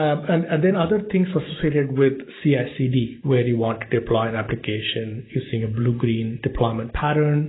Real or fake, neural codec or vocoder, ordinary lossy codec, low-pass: real; none; AAC, 16 kbps; 7.2 kHz